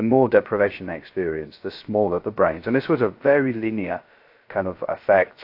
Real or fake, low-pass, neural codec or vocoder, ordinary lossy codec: fake; 5.4 kHz; codec, 16 kHz, 0.3 kbps, FocalCodec; AAC, 32 kbps